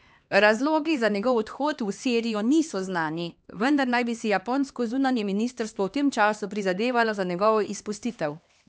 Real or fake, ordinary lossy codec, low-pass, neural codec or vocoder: fake; none; none; codec, 16 kHz, 2 kbps, X-Codec, HuBERT features, trained on LibriSpeech